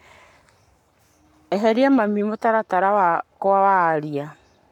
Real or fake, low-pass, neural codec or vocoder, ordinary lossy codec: fake; 19.8 kHz; codec, 44.1 kHz, 7.8 kbps, Pupu-Codec; none